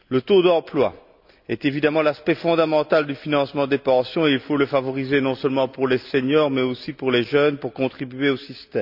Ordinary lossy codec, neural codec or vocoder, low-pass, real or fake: none; none; 5.4 kHz; real